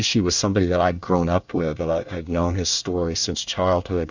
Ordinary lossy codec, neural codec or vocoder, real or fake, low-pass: Opus, 64 kbps; codec, 24 kHz, 1 kbps, SNAC; fake; 7.2 kHz